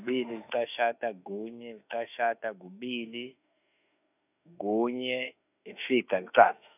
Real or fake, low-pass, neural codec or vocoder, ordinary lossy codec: fake; 3.6 kHz; autoencoder, 48 kHz, 32 numbers a frame, DAC-VAE, trained on Japanese speech; none